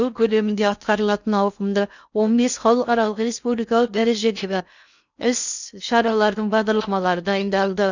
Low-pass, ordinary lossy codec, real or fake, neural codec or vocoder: 7.2 kHz; none; fake; codec, 16 kHz in and 24 kHz out, 0.6 kbps, FocalCodec, streaming, 2048 codes